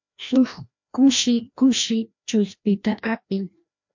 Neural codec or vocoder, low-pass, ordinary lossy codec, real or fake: codec, 16 kHz, 1 kbps, FreqCodec, larger model; 7.2 kHz; MP3, 48 kbps; fake